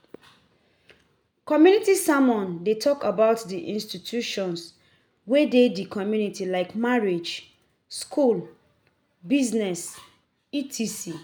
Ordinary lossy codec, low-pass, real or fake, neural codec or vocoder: none; none; real; none